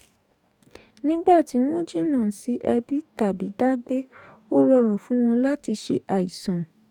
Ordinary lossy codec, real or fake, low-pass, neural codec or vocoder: none; fake; 19.8 kHz; codec, 44.1 kHz, 2.6 kbps, DAC